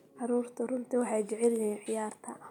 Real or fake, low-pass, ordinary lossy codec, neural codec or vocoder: real; 19.8 kHz; none; none